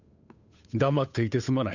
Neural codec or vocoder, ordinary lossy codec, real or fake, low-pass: codec, 16 kHz, 8 kbps, FunCodec, trained on Chinese and English, 25 frames a second; none; fake; 7.2 kHz